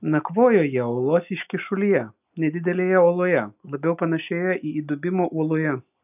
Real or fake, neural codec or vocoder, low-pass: real; none; 3.6 kHz